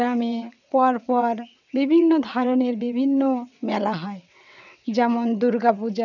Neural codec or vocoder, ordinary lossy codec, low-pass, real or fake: vocoder, 22.05 kHz, 80 mel bands, WaveNeXt; none; 7.2 kHz; fake